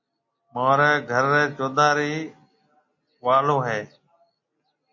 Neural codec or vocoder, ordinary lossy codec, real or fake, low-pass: none; MP3, 32 kbps; real; 7.2 kHz